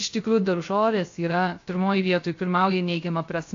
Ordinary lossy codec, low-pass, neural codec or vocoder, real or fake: AAC, 48 kbps; 7.2 kHz; codec, 16 kHz, 0.3 kbps, FocalCodec; fake